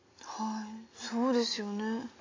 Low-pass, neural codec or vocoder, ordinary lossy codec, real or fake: 7.2 kHz; none; AAC, 32 kbps; real